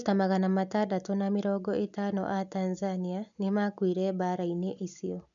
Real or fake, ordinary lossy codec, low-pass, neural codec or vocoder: real; none; 7.2 kHz; none